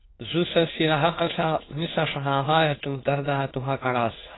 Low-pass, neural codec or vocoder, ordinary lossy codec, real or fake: 7.2 kHz; autoencoder, 22.05 kHz, a latent of 192 numbers a frame, VITS, trained on many speakers; AAC, 16 kbps; fake